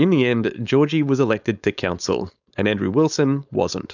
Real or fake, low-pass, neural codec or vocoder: fake; 7.2 kHz; codec, 16 kHz, 4.8 kbps, FACodec